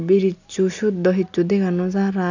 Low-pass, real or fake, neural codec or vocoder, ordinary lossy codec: 7.2 kHz; real; none; none